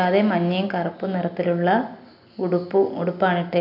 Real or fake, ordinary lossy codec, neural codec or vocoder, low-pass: real; none; none; 5.4 kHz